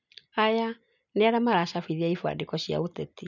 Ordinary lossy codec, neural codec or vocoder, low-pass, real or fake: none; none; 7.2 kHz; real